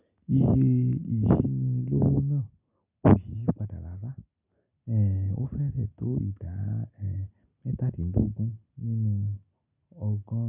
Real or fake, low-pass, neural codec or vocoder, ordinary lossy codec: real; 3.6 kHz; none; none